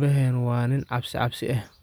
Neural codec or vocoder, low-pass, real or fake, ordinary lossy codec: none; none; real; none